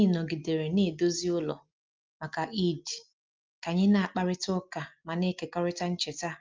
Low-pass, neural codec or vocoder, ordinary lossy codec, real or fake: 7.2 kHz; none; Opus, 24 kbps; real